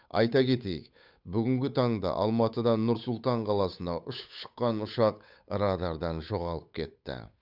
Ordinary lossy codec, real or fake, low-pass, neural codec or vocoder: none; fake; 5.4 kHz; codec, 16 kHz, 8 kbps, FunCodec, trained on Chinese and English, 25 frames a second